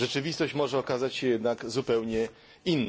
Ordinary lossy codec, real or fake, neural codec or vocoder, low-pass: none; real; none; none